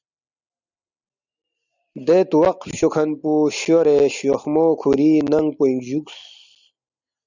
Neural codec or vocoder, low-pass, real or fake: none; 7.2 kHz; real